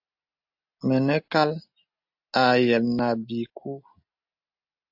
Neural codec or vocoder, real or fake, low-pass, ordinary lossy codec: none; real; 5.4 kHz; AAC, 48 kbps